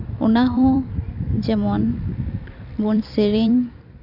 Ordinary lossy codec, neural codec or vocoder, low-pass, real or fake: none; vocoder, 44.1 kHz, 128 mel bands every 256 samples, BigVGAN v2; 5.4 kHz; fake